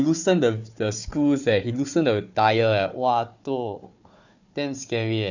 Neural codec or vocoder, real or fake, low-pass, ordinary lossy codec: codec, 16 kHz, 4 kbps, FunCodec, trained on Chinese and English, 50 frames a second; fake; 7.2 kHz; none